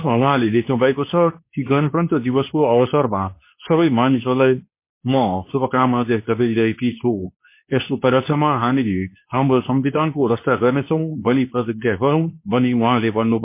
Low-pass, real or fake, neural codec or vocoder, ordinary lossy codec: 3.6 kHz; fake; codec, 24 kHz, 0.9 kbps, WavTokenizer, medium speech release version 2; MP3, 24 kbps